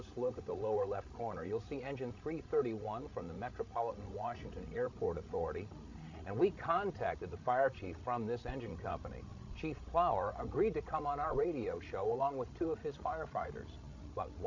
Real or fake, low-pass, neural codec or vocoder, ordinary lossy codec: fake; 7.2 kHz; codec, 16 kHz, 16 kbps, FreqCodec, larger model; AAC, 48 kbps